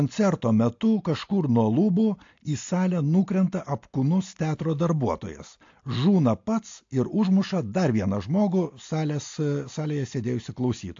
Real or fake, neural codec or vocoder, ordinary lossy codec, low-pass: real; none; AAC, 48 kbps; 7.2 kHz